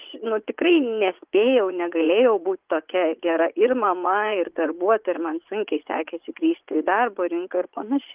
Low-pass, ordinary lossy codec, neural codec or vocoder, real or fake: 3.6 kHz; Opus, 32 kbps; vocoder, 44.1 kHz, 80 mel bands, Vocos; fake